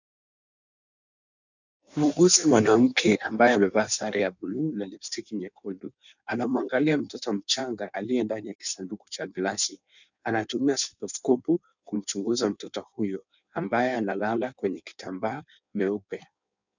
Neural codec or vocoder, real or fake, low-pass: codec, 16 kHz in and 24 kHz out, 1.1 kbps, FireRedTTS-2 codec; fake; 7.2 kHz